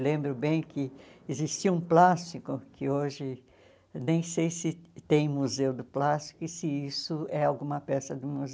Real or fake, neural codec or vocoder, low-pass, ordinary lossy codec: real; none; none; none